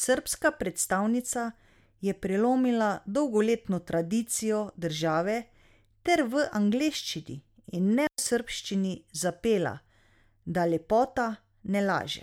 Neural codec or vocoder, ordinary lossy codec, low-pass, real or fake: none; MP3, 96 kbps; 19.8 kHz; real